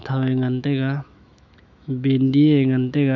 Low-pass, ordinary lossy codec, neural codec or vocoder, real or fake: 7.2 kHz; none; autoencoder, 48 kHz, 128 numbers a frame, DAC-VAE, trained on Japanese speech; fake